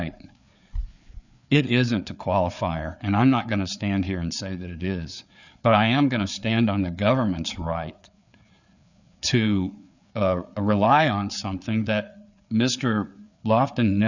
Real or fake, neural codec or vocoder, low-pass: fake; codec, 16 kHz, 4 kbps, FreqCodec, larger model; 7.2 kHz